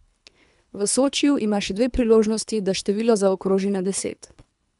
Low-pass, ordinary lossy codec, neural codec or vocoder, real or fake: 10.8 kHz; none; codec, 24 kHz, 3 kbps, HILCodec; fake